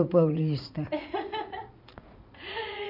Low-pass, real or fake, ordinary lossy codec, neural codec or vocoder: 5.4 kHz; fake; none; vocoder, 44.1 kHz, 80 mel bands, Vocos